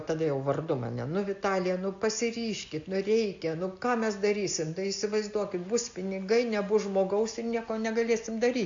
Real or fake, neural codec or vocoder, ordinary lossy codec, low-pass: real; none; AAC, 64 kbps; 7.2 kHz